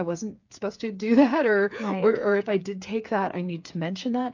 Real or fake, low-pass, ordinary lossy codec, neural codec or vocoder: fake; 7.2 kHz; AAC, 48 kbps; codec, 16 kHz, 8 kbps, FreqCodec, smaller model